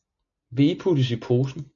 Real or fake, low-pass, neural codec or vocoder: real; 7.2 kHz; none